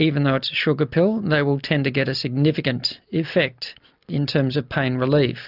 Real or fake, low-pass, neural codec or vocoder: real; 5.4 kHz; none